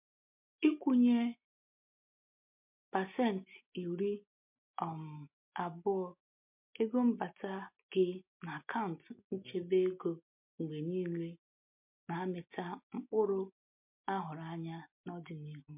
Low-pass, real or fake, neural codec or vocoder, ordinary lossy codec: 3.6 kHz; real; none; MP3, 32 kbps